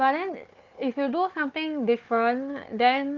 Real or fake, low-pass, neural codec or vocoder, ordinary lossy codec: fake; 7.2 kHz; codec, 16 kHz, 4 kbps, FunCodec, trained on Chinese and English, 50 frames a second; Opus, 32 kbps